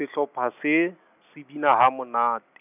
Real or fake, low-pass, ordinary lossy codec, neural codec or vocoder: real; 3.6 kHz; none; none